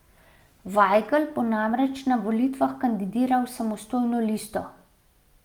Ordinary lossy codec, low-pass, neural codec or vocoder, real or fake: Opus, 32 kbps; 19.8 kHz; none; real